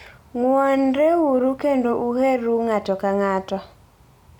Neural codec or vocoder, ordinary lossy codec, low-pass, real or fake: none; none; 19.8 kHz; real